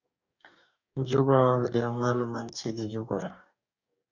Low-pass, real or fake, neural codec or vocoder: 7.2 kHz; fake; codec, 44.1 kHz, 2.6 kbps, DAC